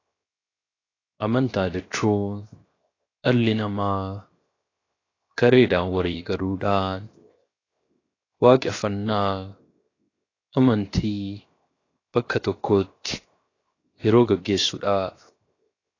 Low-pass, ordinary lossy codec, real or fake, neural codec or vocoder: 7.2 kHz; AAC, 32 kbps; fake; codec, 16 kHz, 0.7 kbps, FocalCodec